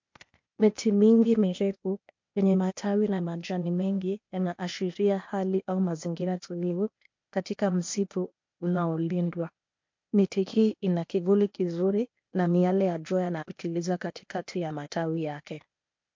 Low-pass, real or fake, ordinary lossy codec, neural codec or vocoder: 7.2 kHz; fake; MP3, 48 kbps; codec, 16 kHz, 0.8 kbps, ZipCodec